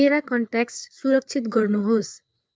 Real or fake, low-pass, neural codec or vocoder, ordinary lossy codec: fake; none; codec, 16 kHz, 4 kbps, FreqCodec, larger model; none